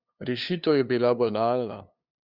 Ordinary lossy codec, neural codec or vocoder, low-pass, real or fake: Opus, 64 kbps; codec, 16 kHz, 2 kbps, FunCodec, trained on LibriTTS, 25 frames a second; 5.4 kHz; fake